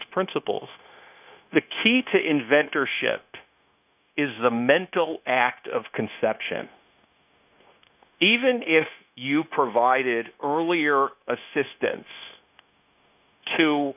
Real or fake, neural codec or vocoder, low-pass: fake; codec, 16 kHz, 0.9 kbps, LongCat-Audio-Codec; 3.6 kHz